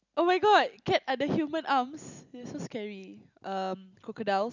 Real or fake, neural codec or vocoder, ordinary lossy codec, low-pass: real; none; none; 7.2 kHz